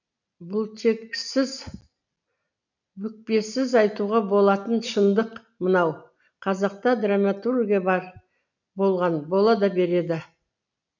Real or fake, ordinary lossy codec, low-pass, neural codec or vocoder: real; none; 7.2 kHz; none